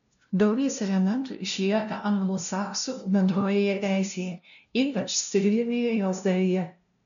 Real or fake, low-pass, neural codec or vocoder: fake; 7.2 kHz; codec, 16 kHz, 0.5 kbps, FunCodec, trained on LibriTTS, 25 frames a second